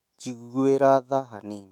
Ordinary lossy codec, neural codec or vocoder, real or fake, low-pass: none; autoencoder, 48 kHz, 128 numbers a frame, DAC-VAE, trained on Japanese speech; fake; 19.8 kHz